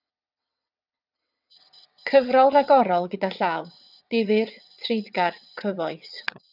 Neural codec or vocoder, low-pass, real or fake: vocoder, 22.05 kHz, 80 mel bands, WaveNeXt; 5.4 kHz; fake